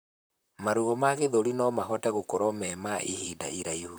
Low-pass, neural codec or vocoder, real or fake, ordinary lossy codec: none; vocoder, 44.1 kHz, 128 mel bands, Pupu-Vocoder; fake; none